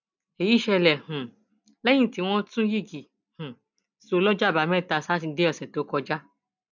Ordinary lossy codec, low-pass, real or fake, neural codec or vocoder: none; 7.2 kHz; real; none